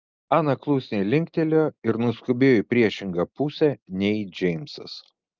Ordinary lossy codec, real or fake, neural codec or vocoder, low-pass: Opus, 24 kbps; real; none; 7.2 kHz